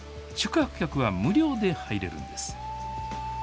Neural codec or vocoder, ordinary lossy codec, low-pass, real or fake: none; none; none; real